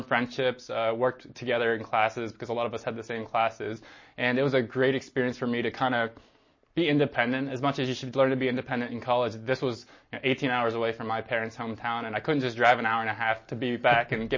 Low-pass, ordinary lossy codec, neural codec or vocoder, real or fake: 7.2 kHz; MP3, 32 kbps; none; real